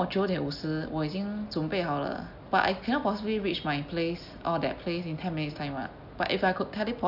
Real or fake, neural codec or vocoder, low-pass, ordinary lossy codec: real; none; 5.4 kHz; none